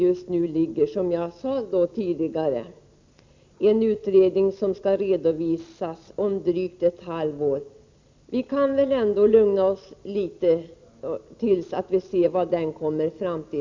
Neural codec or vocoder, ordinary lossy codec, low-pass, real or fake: none; MP3, 64 kbps; 7.2 kHz; real